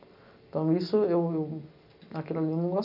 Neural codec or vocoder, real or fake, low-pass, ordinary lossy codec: none; real; 5.4 kHz; none